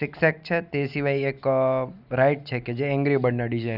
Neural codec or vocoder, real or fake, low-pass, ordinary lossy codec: none; real; 5.4 kHz; none